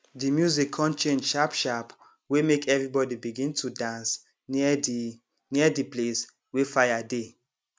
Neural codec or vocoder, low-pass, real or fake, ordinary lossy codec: none; none; real; none